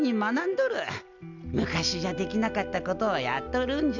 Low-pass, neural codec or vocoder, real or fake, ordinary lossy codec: 7.2 kHz; none; real; none